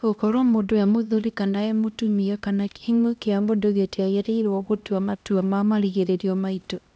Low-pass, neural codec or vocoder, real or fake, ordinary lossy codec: none; codec, 16 kHz, 1 kbps, X-Codec, HuBERT features, trained on LibriSpeech; fake; none